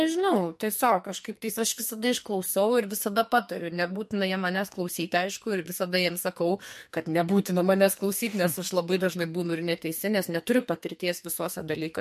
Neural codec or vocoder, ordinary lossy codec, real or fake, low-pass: codec, 44.1 kHz, 2.6 kbps, SNAC; MP3, 64 kbps; fake; 14.4 kHz